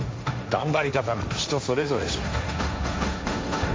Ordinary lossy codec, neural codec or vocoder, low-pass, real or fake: none; codec, 16 kHz, 1.1 kbps, Voila-Tokenizer; none; fake